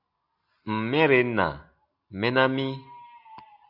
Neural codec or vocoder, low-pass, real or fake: none; 5.4 kHz; real